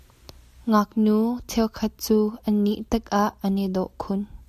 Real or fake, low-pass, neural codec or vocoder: real; 14.4 kHz; none